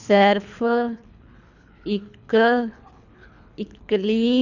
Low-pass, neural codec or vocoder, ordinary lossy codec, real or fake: 7.2 kHz; codec, 24 kHz, 3 kbps, HILCodec; none; fake